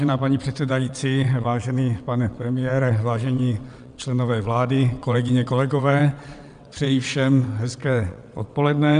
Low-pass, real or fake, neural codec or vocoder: 9.9 kHz; fake; vocoder, 22.05 kHz, 80 mel bands, WaveNeXt